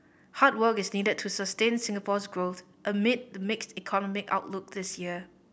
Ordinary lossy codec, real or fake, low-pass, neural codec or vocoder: none; real; none; none